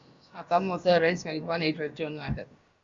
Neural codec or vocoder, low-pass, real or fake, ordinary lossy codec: codec, 16 kHz, about 1 kbps, DyCAST, with the encoder's durations; 7.2 kHz; fake; Opus, 64 kbps